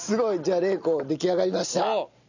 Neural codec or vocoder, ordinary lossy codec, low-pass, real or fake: none; none; 7.2 kHz; real